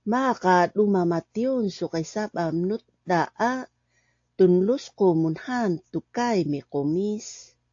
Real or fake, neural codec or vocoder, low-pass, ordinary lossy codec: real; none; 7.2 kHz; AAC, 48 kbps